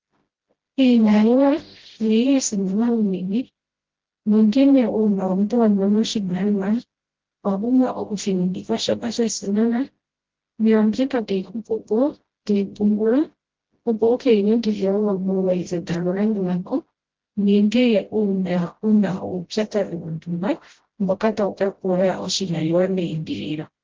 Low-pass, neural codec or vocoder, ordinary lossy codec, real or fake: 7.2 kHz; codec, 16 kHz, 0.5 kbps, FreqCodec, smaller model; Opus, 16 kbps; fake